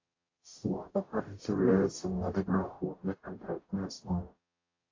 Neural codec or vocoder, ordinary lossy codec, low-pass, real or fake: codec, 44.1 kHz, 0.9 kbps, DAC; AAC, 32 kbps; 7.2 kHz; fake